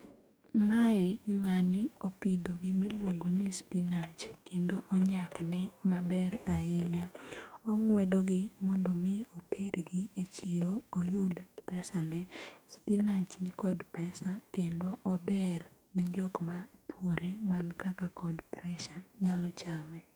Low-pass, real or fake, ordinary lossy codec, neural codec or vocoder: none; fake; none; codec, 44.1 kHz, 2.6 kbps, DAC